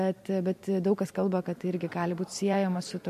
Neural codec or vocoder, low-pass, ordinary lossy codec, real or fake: vocoder, 44.1 kHz, 128 mel bands every 512 samples, BigVGAN v2; 14.4 kHz; MP3, 64 kbps; fake